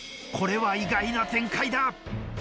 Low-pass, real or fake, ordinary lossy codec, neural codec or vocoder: none; real; none; none